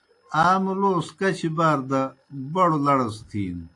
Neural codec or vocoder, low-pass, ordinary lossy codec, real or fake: none; 10.8 kHz; AAC, 48 kbps; real